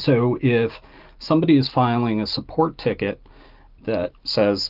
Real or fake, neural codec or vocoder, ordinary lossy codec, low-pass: real; none; Opus, 24 kbps; 5.4 kHz